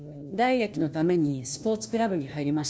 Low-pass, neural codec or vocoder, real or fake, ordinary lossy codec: none; codec, 16 kHz, 1 kbps, FunCodec, trained on LibriTTS, 50 frames a second; fake; none